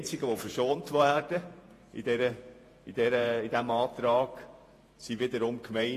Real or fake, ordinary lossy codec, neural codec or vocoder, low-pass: fake; AAC, 48 kbps; vocoder, 48 kHz, 128 mel bands, Vocos; 14.4 kHz